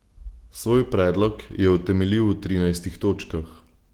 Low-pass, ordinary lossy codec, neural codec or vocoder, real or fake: 19.8 kHz; Opus, 16 kbps; autoencoder, 48 kHz, 128 numbers a frame, DAC-VAE, trained on Japanese speech; fake